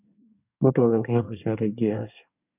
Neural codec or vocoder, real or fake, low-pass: codec, 24 kHz, 1 kbps, SNAC; fake; 3.6 kHz